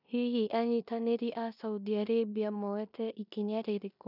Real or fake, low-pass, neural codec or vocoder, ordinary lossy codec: fake; 5.4 kHz; codec, 16 kHz in and 24 kHz out, 0.9 kbps, LongCat-Audio-Codec, four codebook decoder; none